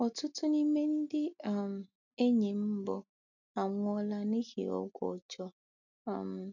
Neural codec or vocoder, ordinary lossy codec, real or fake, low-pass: none; none; real; 7.2 kHz